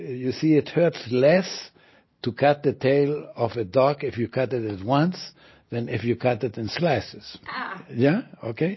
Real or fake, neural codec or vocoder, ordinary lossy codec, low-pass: real; none; MP3, 24 kbps; 7.2 kHz